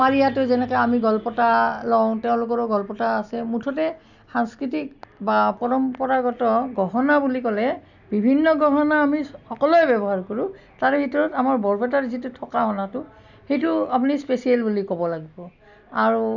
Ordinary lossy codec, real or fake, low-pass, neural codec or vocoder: none; real; 7.2 kHz; none